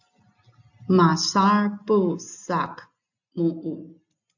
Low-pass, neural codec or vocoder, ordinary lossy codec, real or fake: 7.2 kHz; none; AAC, 48 kbps; real